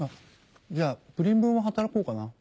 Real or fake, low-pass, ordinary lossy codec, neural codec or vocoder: real; none; none; none